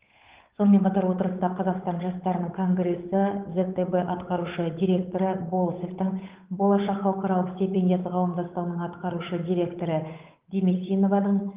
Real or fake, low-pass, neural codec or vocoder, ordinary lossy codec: fake; 3.6 kHz; codec, 16 kHz, 8 kbps, FunCodec, trained on Chinese and English, 25 frames a second; Opus, 32 kbps